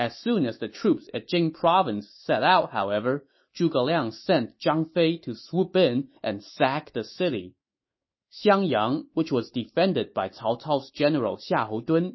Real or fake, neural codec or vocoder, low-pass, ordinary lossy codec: fake; autoencoder, 48 kHz, 128 numbers a frame, DAC-VAE, trained on Japanese speech; 7.2 kHz; MP3, 24 kbps